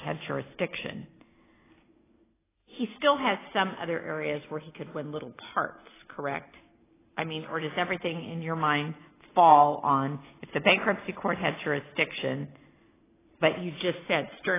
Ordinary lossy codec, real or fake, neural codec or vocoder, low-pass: AAC, 16 kbps; real; none; 3.6 kHz